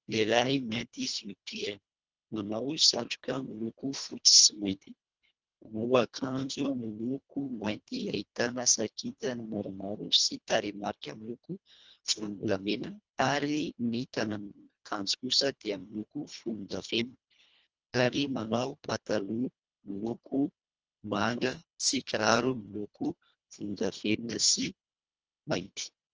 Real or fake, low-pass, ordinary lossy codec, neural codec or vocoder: fake; 7.2 kHz; Opus, 24 kbps; codec, 24 kHz, 1.5 kbps, HILCodec